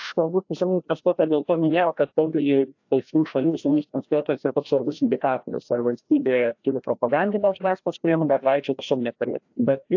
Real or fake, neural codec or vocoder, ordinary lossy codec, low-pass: fake; codec, 16 kHz, 1 kbps, FreqCodec, larger model; AAC, 48 kbps; 7.2 kHz